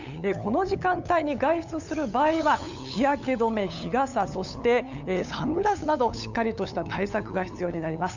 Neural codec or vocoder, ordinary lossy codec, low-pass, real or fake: codec, 16 kHz, 8 kbps, FunCodec, trained on LibriTTS, 25 frames a second; none; 7.2 kHz; fake